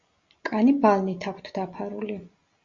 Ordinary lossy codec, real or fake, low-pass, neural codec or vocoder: Opus, 64 kbps; real; 7.2 kHz; none